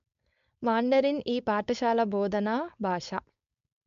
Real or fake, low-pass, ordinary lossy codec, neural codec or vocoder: fake; 7.2 kHz; MP3, 64 kbps; codec, 16 kHz, 4.8 kbps, FACodec